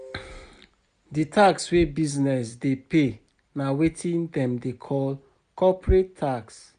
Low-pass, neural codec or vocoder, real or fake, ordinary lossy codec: 9.9 kHz; none; real; none